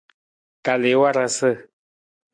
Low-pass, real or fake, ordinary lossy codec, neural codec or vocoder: 9.9 kHz; real; MP3, 64 kbps; none